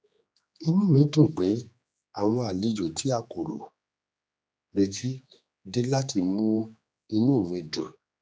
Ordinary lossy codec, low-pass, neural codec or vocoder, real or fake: none; none; codec, 16 kHz, 2 kbps, X-Codec, HuBERT features, trained on general audio; fake